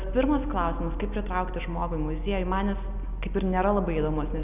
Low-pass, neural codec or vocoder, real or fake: 3.6 kHz; none; real